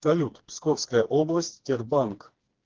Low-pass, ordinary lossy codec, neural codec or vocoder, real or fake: 7.2 kHz; Opus, 16 kbps; codec, 16 kHz, 2 kbps, FreqCodec, smaller model; fake